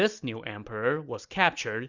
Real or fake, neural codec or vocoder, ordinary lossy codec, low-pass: real; none; Opus, 64 kbps; 7.2 kHz